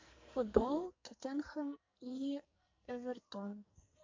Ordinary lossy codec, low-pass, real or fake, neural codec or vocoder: MP3, 48 kbps; 7.2 kHz; fake; codec, 44.1 kHz, 2.6 kbps, SNAC